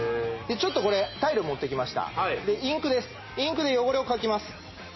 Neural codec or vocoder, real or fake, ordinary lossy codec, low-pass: none; real; MP3, 24 kbps; 7.2 kHz